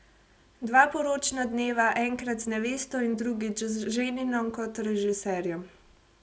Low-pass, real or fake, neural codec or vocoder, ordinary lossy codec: none; real; none; none